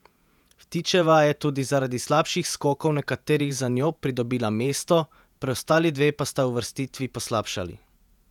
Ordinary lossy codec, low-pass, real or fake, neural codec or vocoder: none; 19.8 kHz; fake; vocoder, 48 kHz, 128 mel bands, Vocos